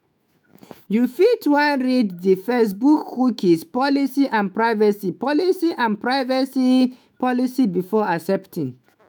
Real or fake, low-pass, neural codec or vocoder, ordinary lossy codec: fake; none; autoencoder, 48 kHz, 128 numbers a frame, DAC-VAE, trained on Japanese speech; none